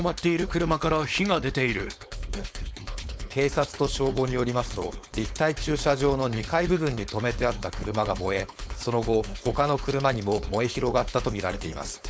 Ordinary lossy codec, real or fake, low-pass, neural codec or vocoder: none; fake; none; codec, 16 kHz, 4.8 kbps, FACodec